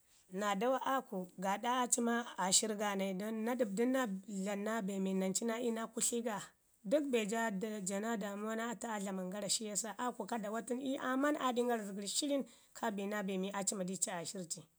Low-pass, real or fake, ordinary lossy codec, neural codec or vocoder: none; real; none; none